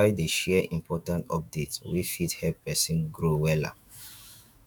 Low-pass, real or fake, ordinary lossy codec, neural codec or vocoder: 19.8 kHz; fake; none; autoencoder, 48 kHz, 128 numbers a frame, DAC-VAE, trained on Japanese speech